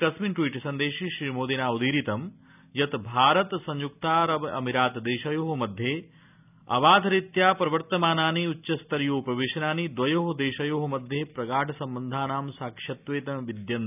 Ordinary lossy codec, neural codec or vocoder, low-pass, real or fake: none; none; 3.6 kHz; real